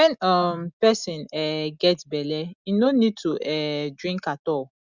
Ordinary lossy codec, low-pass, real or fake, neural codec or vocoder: Opus, 64 kbps; 7.2 kHz; real; none